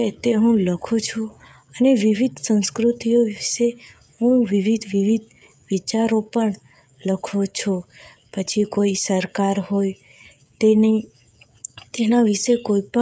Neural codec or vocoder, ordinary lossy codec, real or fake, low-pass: codec, 16 kHz, 16 kbps, FreqCodec, smaller model; none; fake; none